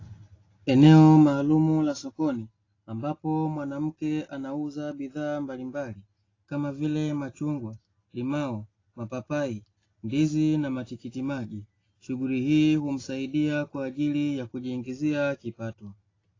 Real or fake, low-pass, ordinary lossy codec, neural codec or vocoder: real; 7.2 kHz; AAC, 32 kbps; none